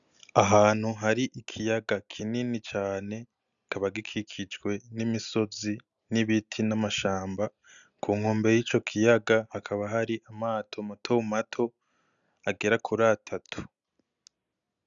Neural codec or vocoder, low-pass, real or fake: none; 7.2 kHz; real